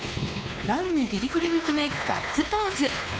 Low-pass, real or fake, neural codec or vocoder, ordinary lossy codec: none; fake; codec, 16 kHz, 2 kbps, X-Codec, WavLM features, trained on Multilingual LibriSpeech; none